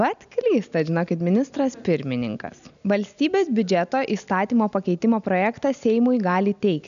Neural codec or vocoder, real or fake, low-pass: none; real; 7.2 kHz